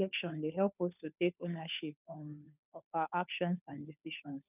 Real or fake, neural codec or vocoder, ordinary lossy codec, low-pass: fake; codec, 16 kHz, 2 kbps, FunCodec, trained on Chinese and English, 25 frames a second; none; 3.6 kHz